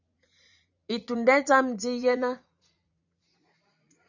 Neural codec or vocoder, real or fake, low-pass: vocoder, 44.1 kHz, 80 mel bands, Vocos; fake; 7.2 kHz